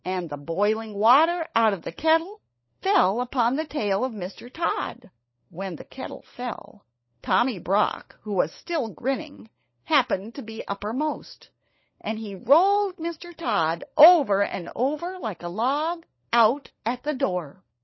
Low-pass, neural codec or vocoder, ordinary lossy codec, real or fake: 7.2 kHz; codec, 16 kHz, 16 kbps, FunCodec, trained on LibriTTS, 50 frames a second; MP3, 24 kbps; fake